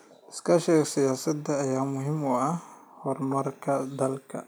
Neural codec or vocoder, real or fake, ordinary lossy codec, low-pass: none; real; none; none